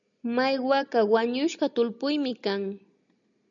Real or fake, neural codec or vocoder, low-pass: real; none; 7.2 kHz